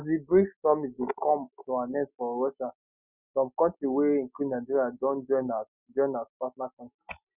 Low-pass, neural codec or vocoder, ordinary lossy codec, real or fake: 3.6 kHz; none; Opus, 64 kbps; real